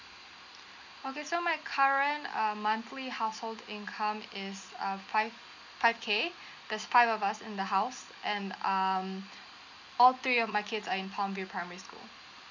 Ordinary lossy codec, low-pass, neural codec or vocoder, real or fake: none; 7.2 kHz; none; real